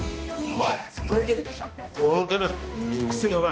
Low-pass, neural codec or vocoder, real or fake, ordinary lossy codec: none; codec, 16 kHz, 1 kbps, X-Codec, HuBERT features, trained on balanced general audio; fake; none